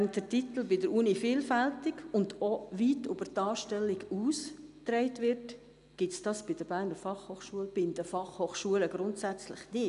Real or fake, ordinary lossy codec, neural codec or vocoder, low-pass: real; AAC, 96 kbps; none; 10.8 kHz